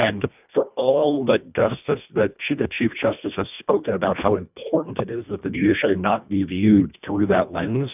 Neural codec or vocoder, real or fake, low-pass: codec, 24 kHz, 1.5 kbps, HILCodec; fake; 3.6 kHz